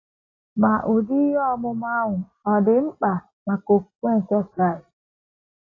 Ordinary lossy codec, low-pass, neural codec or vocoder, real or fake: AAC, 32 kbps; 7.2 kHz; none; real